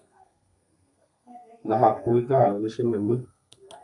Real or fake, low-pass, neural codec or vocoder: fake; 10.8 kHz; codec, 32 kHz, 1.9 kbps, SNAC